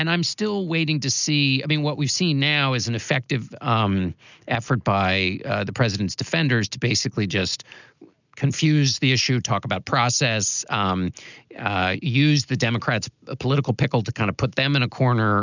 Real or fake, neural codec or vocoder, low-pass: real; none; 7.2 kHz